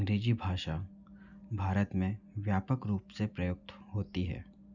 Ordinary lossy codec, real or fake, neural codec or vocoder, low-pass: none; real; none; 7.2 kHz